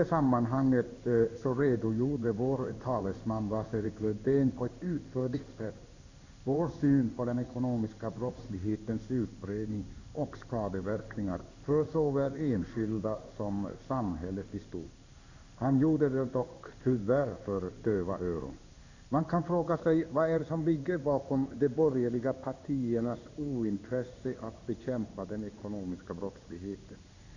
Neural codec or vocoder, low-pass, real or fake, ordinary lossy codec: codec, 16 kHz in and 24 kHz out, 1 kbps, XY-Tokenizer; 7.2 kHz; fake; Opus, 64 kbps